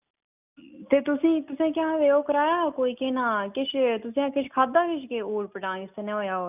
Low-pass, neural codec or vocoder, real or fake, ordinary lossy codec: 3.6 kHz; none; real; none